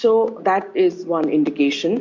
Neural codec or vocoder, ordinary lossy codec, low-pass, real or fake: none; MP3, 48 kbps; 7.2 kHz; real